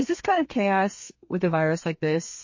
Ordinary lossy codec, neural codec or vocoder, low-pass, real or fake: MP3, 32 kbps; codec, 32 kHz, 1.9 kbps, SNAC; 7.2 kHz; fake